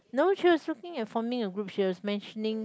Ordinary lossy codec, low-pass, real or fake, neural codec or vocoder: none; none; real; none